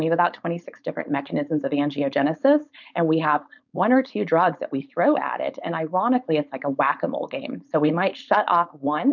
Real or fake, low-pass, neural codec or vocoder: fake; 7.2 kHz; codec, 16 kHz, 4.8 kbps, FACodec